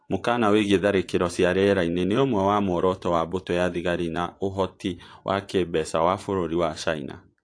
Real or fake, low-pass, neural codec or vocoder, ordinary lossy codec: fake; 9.9 kHz; vocoder, 24 kHz, 100 mel bands, Vocos; AAC, 48 kbps